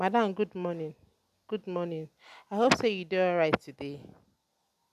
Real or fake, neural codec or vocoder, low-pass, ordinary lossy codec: real; none; 14.4 kHz; none